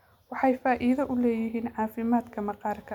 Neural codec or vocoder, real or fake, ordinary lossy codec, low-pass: none; real; none; 19.8 kHz